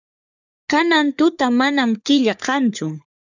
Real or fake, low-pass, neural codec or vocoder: fake; 7.2 kHz; codec, 44.1 kHz, 3.4 kbps, Pupu-Codec